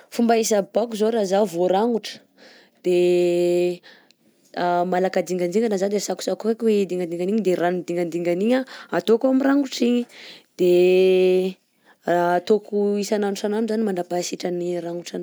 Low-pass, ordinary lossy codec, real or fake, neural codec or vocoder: none; none; real; none